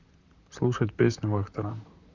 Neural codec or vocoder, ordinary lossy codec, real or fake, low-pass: vocoder, 44.1 kHz, 128 mel bands, Pupu-Vocoder; Opus, 64 kbps; fake; 7.2 kHz